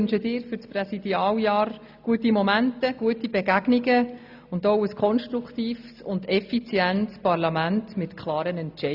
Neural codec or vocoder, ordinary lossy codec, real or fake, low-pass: none; AAC, 48 kbps; real; 5.4 kHz